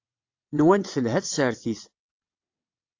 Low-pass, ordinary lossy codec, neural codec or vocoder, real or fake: 7.2 kHz; AAC, 48 kbps; vocoder, 22.05 kHz, 80 mel bands, WaveNeXt; fake